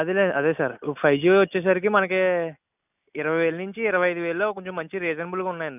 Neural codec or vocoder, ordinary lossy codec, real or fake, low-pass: none; none; real; 3.6 kHz